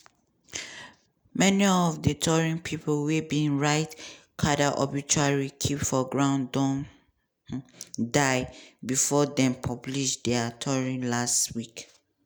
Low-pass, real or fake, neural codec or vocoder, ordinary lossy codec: none; real; none; none